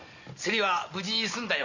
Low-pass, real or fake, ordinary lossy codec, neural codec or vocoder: 7.2 kHz; real; Opus, 64 kbps; none